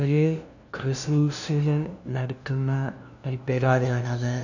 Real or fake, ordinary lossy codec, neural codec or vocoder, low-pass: fake; none; codec, 16 kHz, 0.5 kbps, FunCodec, trained on LibriTTS, 25 frames a second; 7.2 kHz